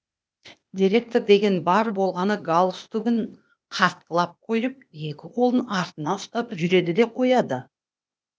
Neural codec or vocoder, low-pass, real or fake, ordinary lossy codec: codec, 16 kHz, 0.8 kbps, ZipCodec; none; fake; none